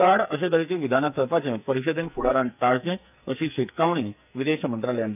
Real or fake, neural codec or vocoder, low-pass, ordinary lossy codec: fake; codec, 44.1 kHz, 2.6 kbps, SNAC; 3.6 kHz; none